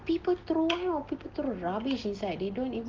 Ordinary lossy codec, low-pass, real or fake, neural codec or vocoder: Opus, 16 kbps; 7.2 kHz; real; none